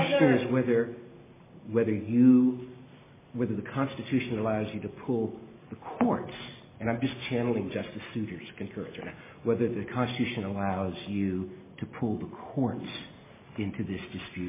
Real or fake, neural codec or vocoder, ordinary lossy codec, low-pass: real; none; MP3, 16 kbps; 3.6 kHz